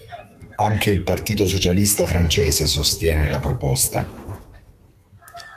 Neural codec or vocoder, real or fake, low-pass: codec, 32 kHz, 1.9 kbps, SNAC; fake; 14.4 kHz